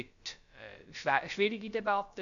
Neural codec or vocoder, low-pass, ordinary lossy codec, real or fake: codec, 16 kHz, about 1 kbps, DyCAST, with the encoder's durations; 7.2 kHz; none; fake